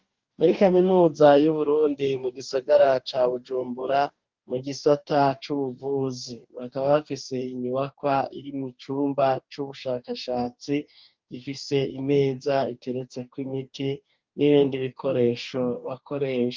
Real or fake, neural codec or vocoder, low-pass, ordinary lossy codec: fake; codec, 44.1 kHz, 2.6 kbps, DAC; 7.2 kHz; Opus, 32 kbps